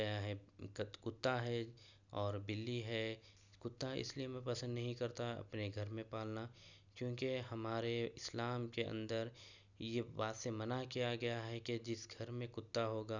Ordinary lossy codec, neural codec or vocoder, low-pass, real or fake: none; none; 7.2 kHz; real